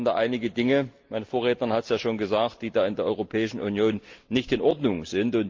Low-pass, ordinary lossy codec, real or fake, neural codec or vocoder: 7.2 kHz; Opus, 24 kbps; real; none